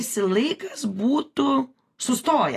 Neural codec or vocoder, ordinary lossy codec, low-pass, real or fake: none; AAC, 48 kbps; 14.4 kHz; real